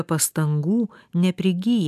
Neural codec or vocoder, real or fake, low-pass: none; real; 14.4 kHz